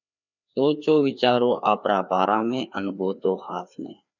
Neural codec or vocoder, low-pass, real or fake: codec, 16 kHz, 2 kbps, FreqCodec, larger model; 7.2 kHz; fake